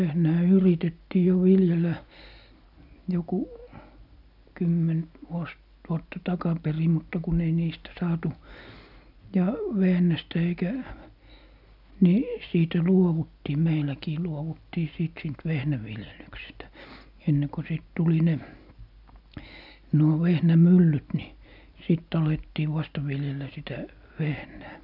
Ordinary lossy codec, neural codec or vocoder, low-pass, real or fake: none; none; 5.4 kHz; real